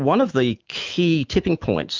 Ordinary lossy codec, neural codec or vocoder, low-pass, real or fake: Opus, 32 kbps; autoencoder, 48 kHz, 128 numbers a frame, DAC-VAE, trained on Japanese speech; 7.2 kHz; fake